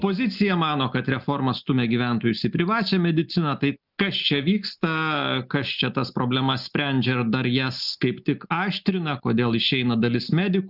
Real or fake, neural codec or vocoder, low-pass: real; none; 5.4 kHz